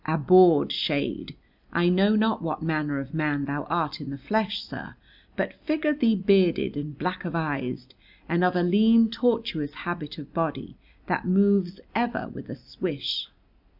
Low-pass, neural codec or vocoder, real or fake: 5.4 kHz; none; real